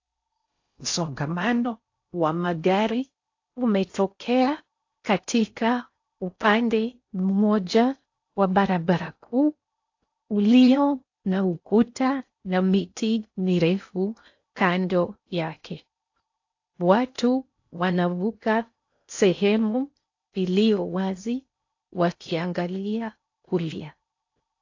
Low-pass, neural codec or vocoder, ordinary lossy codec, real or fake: 7.2 kHz; codec, 16 kHz in and 24 kHz out, 0.6 kbps, FocalCodec, streaming, 4096 codes; AAC, 48 kbps; fake